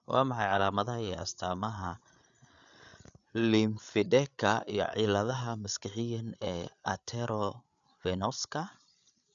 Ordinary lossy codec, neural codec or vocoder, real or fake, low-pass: none; codec, 16 kHz, 8 kbps, FreqCodec, larger model; fake; 7.2 kHz